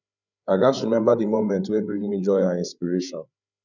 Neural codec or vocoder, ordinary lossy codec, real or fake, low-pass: codec, 16 kHz, 8 kbps, FreqCodec, larger model; none; fake; 7.2 kHz